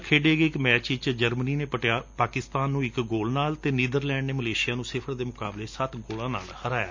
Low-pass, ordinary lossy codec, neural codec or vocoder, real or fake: 7.2 kHz; MP3, 64 kbps; none; real